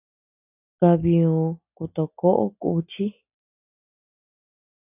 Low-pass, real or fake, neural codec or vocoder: 3.6 kHz; real; none